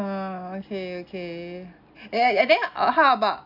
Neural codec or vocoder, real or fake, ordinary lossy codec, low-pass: none; real; AAC, 48 kbps; 5.4 kHz